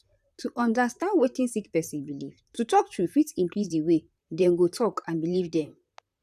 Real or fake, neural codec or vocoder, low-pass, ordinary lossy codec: fake; vocoder, 44.1 kHz, 128 mel bands, Pupu-Vocoder; 14.4 kHz; none